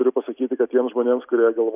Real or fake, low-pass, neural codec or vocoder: real; 3.6 kHz; none